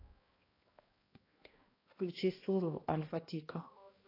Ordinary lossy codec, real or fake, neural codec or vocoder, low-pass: AAC, 24 kbps; fake; codec, 16 kHz, 1 kbps, X-Codec, HuBERT features, trained on balanced general audio; 5.4 kHz